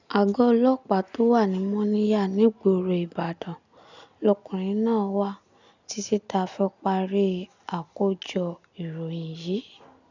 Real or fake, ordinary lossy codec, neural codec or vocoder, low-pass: real; none; none; 7.2 kHz